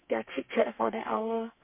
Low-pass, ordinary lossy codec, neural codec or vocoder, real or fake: 3.6 kHz; MP3, 32 kbps; codec, 32 kHz, 1.9 kbps, SNAC; fake